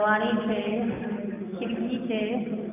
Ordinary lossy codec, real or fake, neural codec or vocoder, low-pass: none; real; none; 3.6 kHz